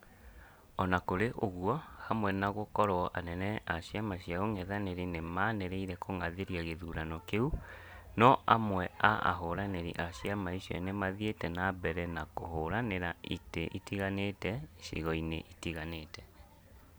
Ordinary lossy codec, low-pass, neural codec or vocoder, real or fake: none; none; none; real